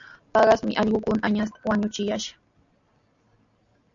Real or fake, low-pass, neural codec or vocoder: real; 7.2 kHz; none